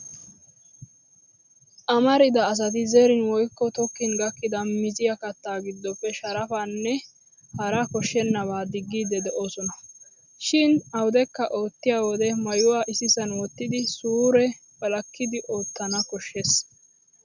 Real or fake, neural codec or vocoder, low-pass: real; none; 7.2 kHz